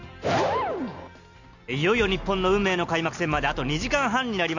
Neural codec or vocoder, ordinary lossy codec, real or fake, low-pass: none; none; real; 7.2 kHz